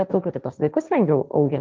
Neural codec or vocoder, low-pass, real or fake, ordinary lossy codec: codec, 16 kHz, 1.1 kbps, Voila-Tokenizer; 7.2 kHz; fake; Opus, 32 kbps